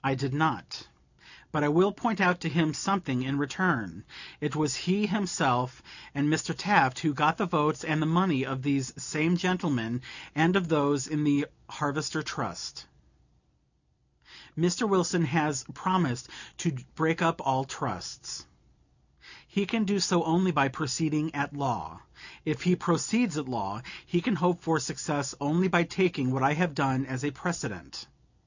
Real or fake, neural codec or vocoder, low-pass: real; none; 7.2 kHz